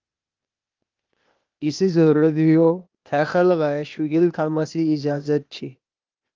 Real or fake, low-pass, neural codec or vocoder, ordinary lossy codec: fake; 7.2 kHz; codec, 16 kHz, 0.8 kbps, ZipCodec; Opus, 24 kbps